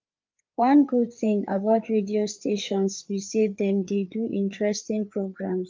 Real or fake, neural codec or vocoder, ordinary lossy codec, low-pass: fake; codec, 16 kHz, 4 kbps, FreqCodec, larger model; Opus, 24 kbps; 7.2 kHz